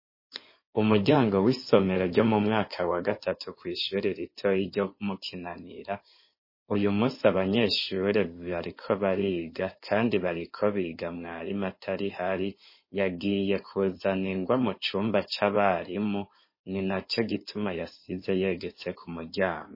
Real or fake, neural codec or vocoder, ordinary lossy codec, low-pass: fake; codec, 16 kHz in and 24 kHz out, 2.2 kbps, FireRedTTS-2 codec; MP3, 24 kbps; 5.4 kHz